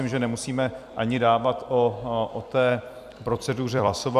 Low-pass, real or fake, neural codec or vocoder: 14.4 kHz; fake; vocoder, 44.1 kHz, 128 mel bands every 256 samples, BigVGAN v2